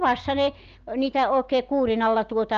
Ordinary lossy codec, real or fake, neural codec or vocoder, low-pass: Opus, 32 kbps; real; none; 7.2 kHz